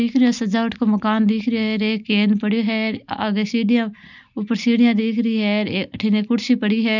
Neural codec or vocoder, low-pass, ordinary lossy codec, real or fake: none; 7.2 kHz; none; real